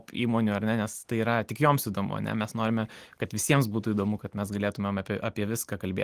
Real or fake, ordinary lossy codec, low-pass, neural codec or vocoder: real; Opus, 24 kbps; 14.4 kHz; none